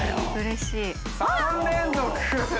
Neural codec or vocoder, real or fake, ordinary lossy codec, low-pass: none; real; none; none